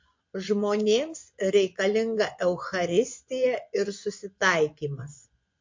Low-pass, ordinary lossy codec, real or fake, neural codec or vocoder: 7.2 kHz; MP3, 48 kbps; fake; vocoder, 24 kHz, 100 mel bands, Vocos